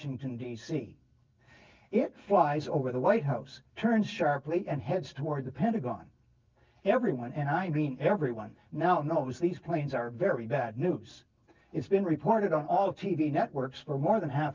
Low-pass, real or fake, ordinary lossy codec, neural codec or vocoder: 7.2 kHz; real; Opus, 24 kbps; none